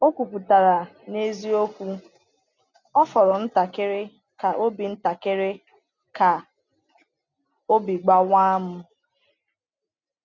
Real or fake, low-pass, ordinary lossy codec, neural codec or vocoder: real; 7.2 kHz; none; none